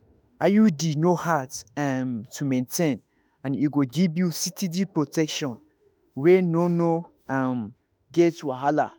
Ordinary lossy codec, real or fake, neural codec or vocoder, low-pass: none; fake; autoencoder, 48 kHz, 32 numbers a frame, DAC-VAE, trained on Japanese speech; none